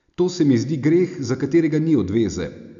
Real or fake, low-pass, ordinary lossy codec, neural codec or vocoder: real; 7.2 kHz; none; none